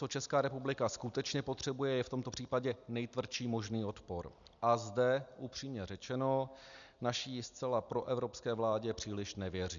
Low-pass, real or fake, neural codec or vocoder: 7.2 kHz; real; none